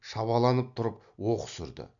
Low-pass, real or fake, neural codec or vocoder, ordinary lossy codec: 7.2 kHz; real; none; none